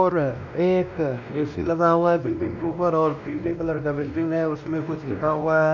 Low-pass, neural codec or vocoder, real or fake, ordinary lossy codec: 7.2 kHz; codec, 16 kHz, 1 kbps, X-Codec, WavLM features, trained on Multilingual LibriSpeech; fake; none